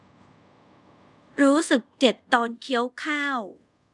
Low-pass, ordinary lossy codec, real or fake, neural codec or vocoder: 10.8 kHz; none; fake; codec, 24 kHz, 0.5 kbps, DualCodec